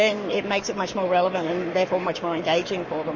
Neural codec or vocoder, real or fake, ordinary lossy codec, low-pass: codec, 44.1 kHz, 7.8 kbps, Pupu-Codec; fake; MP3, 32 kbps; 7.2 kHz